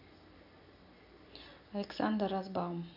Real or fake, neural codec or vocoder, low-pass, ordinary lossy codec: real; none; 5.4 kHz; none